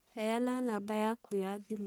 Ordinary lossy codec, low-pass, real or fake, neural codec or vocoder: none; none; fake; codec, 44.1 kHz, 1.7 kbps, Pupu-Codec